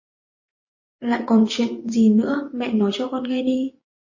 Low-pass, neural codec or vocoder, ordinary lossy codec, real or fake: 7.2 kHz; vocoder, 24 kHz, 100 mel bands, Vocos; MP3, 32 kbps; fake